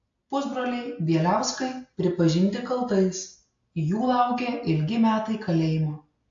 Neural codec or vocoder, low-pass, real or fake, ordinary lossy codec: none; 7.2 kHz; real; AAC, 48 kbps